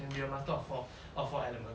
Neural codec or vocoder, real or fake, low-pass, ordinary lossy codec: none; real; none; none